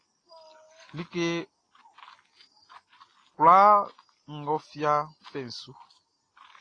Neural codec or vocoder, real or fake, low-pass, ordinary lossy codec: none; real; 9.9 kHz; AAC, 32 kbps